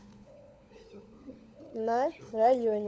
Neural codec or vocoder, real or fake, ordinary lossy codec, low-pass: codec, 16 kHz, 16 kbps, FunCodec, trained on LibriTTS, 50 frames a second; fake; none; none